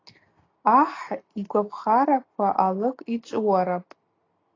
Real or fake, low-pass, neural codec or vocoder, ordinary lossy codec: real; 7.2 kHz; none; AAC, 32 kbps